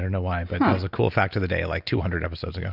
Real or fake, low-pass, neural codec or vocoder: real; 5.4 kHz; none